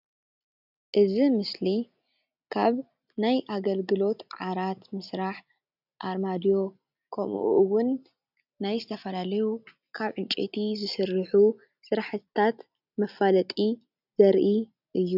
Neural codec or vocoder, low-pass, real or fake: none; 5.4 kHz; real